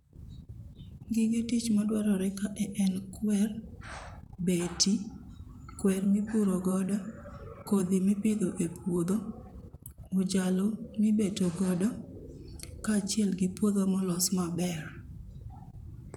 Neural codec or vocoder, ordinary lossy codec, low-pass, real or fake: vocoder, 44.1 kHz, 128 mel bands, Pupu-Vocoder; none; 19.8 kHz; fake